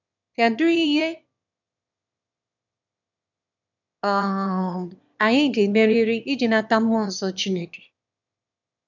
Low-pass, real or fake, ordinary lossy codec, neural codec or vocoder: 7.2 kHz; fake; none; autoencoder, 22.05 kHz, a latent of 192 numbers a frame, VITS, trained on one speaker